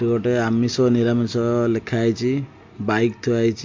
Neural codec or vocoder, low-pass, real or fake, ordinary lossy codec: none; 7.2 kHz; real; MP3, 48 kbps